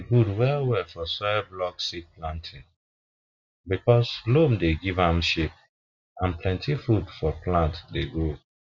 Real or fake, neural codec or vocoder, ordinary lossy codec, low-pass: real; none; none; 7.2 kHz